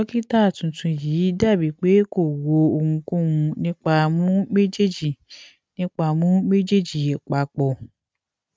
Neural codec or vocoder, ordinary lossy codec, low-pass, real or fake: none; none; none; real